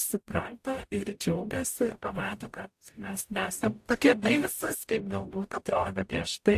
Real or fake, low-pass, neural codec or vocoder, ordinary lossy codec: fake; 14.4 kHz; codec, 44.1 kHz, 0.9 kbps, DAC; AAC, 64 kbps